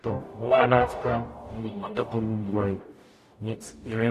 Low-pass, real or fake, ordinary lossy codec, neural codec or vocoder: 14.4 kHz; fake; AAC, 64 kbps; codec, 44.1 kHz, 0.9 kbps, DAC